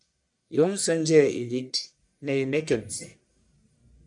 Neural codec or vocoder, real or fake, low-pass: codec, 44.1 kHz, 1.7 kbps, Pupu-Codec; fake; 10.8 kHz